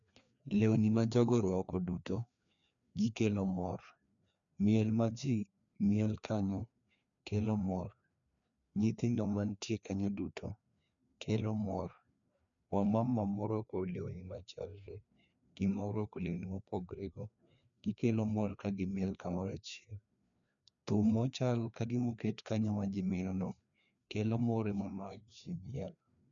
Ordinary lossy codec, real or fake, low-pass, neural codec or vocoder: none; fake; 7.2 kHz; codec, 16 kHz, 2 kbps, FreqCodec, larger model